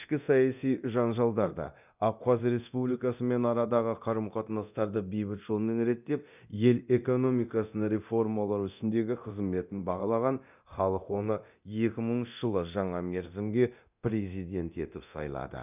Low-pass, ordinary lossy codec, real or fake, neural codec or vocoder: 3.6 kHz; none; fake; codec, 24 kHz, 0.9 kbps, DualCodec